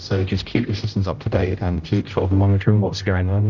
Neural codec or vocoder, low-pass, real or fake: codec, 16 kHz, 0.5 kbps, X-Codec, HuBERT features, trained on general audio; 7.2 kHz; fake